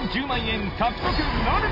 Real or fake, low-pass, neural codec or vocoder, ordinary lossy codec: real; 5.4 kHz; none; none